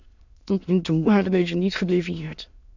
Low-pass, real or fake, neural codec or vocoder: 7.2 kHz; fake; autoencoder, 22.05 kHz, a latent of 192 numbers a frame, VITS, trained on many speakers